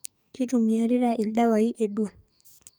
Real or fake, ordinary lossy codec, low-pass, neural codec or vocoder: fake; none; none; codec, 44.1 kHz, 2.6 kbps, SNAC